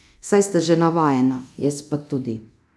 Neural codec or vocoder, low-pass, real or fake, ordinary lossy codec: codec, 24 kHz, 0.9 kbps, DualCodec; none; fake; none